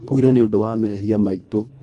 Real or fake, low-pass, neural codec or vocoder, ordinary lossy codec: fake; 10.8 kHz; codec, 24 kHz, 1.5 kbps, HILCodec; none